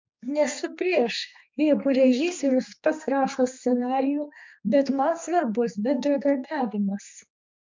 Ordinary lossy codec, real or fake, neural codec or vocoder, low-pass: MP3, 64 kbps; fake; codec, 16 kHz, 2 kbps, X-Codec, HuBERT features, trained on general audio; 7.2 kHz